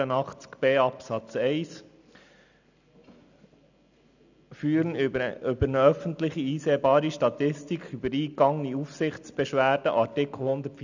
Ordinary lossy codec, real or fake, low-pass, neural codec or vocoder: none; real; 7.2 kHz; none